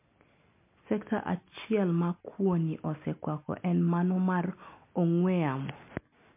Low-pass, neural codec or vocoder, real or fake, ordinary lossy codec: 3.6 kHz; none; real; MP3, 32 kbps